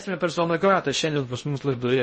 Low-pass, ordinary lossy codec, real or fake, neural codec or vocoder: 10.8 kHz; MP3, 32 kbps; fake; codec, 16 kHz in and 24 kHz out, 0.6 kbps, FocalCodec, streaming, 2048 codes